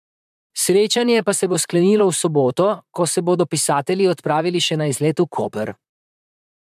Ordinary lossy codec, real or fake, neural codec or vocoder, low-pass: MP3, 96 kbps; fake; vocoder, 44.1 kHz, 128 mel bands, Pupu-Vocoder; 14.4 kHz